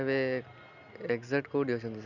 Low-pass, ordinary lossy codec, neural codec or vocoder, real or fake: 7.2 kHz; none; none; real